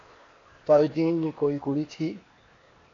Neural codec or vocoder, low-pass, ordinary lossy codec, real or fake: codec, 16 kHz, 0.8 kbps, ZipCodec; 7.2 kHz; AAC, 32 kbps; fake